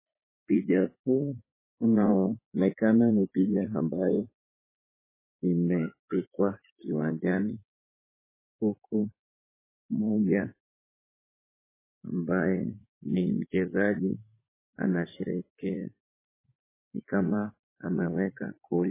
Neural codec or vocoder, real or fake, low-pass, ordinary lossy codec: vocoder, 44.1 kHz, 80 mel bands, Vocos; fake; 3.6 kHz; MP3, 16 kbps